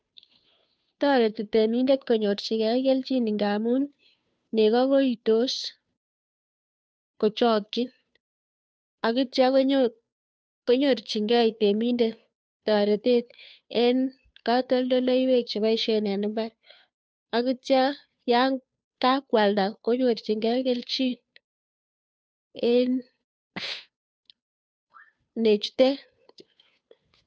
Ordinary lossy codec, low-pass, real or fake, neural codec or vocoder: none; none; fake; codec, 16 kHz, 2 kbps, FunCodec, trained on Chinese and English, 25 frames a second